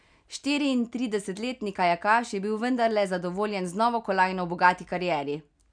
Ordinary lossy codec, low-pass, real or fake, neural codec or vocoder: none; 9.9 kHz; real; none